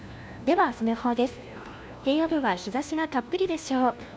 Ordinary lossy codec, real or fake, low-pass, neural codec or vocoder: none; fake; none; codec, 16 kHz, 1 kbps, FunCodec, trained on LibriTTS, 50 frames a second